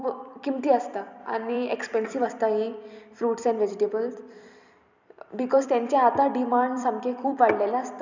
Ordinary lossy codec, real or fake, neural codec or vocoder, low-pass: none; fake; vocoder, 44.1 kHz, 128 mel bands every 256 samples, BigVGAN v2; 7.2 kHz